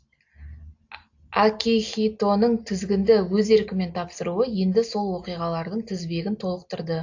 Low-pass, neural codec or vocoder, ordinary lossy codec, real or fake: 7.2 kHz; none; AAC, 48 kbps; real